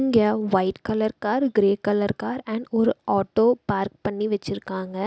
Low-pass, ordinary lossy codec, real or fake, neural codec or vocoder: none; none; real; none